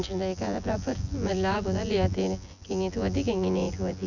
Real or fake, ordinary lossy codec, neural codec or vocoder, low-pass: fake; none; vocoder, 24 kHz, 100 mel bands, Vocos; 7.2 kHz